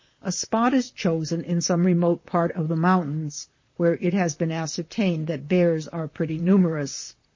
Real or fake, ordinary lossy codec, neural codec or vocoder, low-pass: fake; MP3, 32 kbps; vocoder, 44.1 kHz, 128 mel bands, Pupu-Vocoder; 7.2 kHz